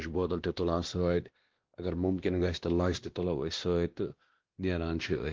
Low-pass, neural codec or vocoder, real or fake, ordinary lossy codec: 7.2 kHz; codec, 16 kHz, 1 kbps, X-Codec, WavLM features, trained on Multilingual LibriSpeech; fake; Opus, 16 kbps